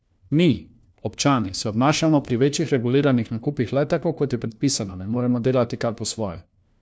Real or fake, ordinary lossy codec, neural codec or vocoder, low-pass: fake; none; codec, 16 kHz, 1 kbps, FunCodec, trained on LibriTTS, 50 frames a second; none